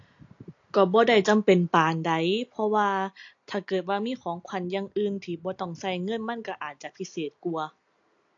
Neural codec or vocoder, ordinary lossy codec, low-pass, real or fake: none; AAC, 48 kbps; 7.2 kHz; real